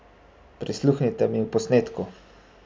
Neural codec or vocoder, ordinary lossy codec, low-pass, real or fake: none; none; none; real